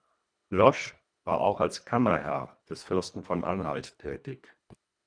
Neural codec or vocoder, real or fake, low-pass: codec, 24 kHz, 1.5 kbps, HILCodec; fake; 9.9 kHz